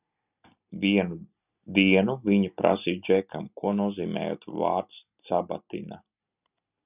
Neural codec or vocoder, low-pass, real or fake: none; 3.6 kHz; real